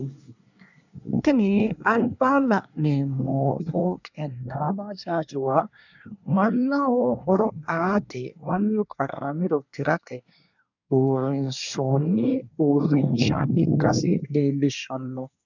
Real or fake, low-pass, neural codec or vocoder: fake; 7.2 kHz; codec, 24 kHz, 1 kbps, SNAC